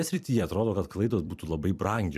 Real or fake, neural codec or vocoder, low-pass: fake; vocoder, 44.1 kHz, 128 mel bands every 256 samples, BigVGAN v2; 14.4 kHz